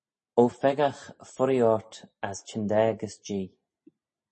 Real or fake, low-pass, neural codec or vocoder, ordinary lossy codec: real; 10.8 kHz; none; MP3, 32 kbps